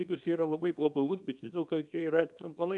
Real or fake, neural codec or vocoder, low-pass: fake; codec, 24 kHz, 0.9 kbps, WavTokenizer, small release; 10.8 kHz